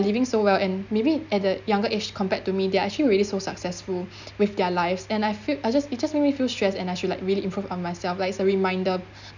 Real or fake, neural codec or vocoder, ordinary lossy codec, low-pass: real; none; none; 7.2 kHz